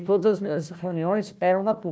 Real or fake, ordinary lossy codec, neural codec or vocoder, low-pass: fake; none; codec, 16 kHz, 1 kbps, FunCodec, trained on Chinese and English, 50 frames a second; none